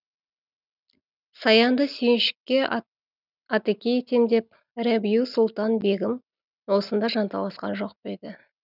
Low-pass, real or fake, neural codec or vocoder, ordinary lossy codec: 5.4 kHz; real; none; none